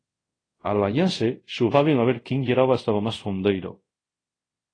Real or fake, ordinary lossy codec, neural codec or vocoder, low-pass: fake; AAC, 32 kbps; codec, 24 kHz, 0.5 kbps, DualCodec; 9.9 kHz